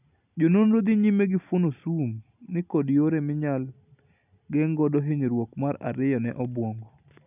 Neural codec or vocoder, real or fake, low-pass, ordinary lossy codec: none; real; 3.6 kHz; none